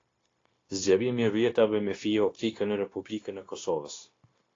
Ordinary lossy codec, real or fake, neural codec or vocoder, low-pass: AAC, 32 kbps; fake; codec, 16 kHz, 0.9 kbps, LongCat-Audio-Codec; 7.2 kHz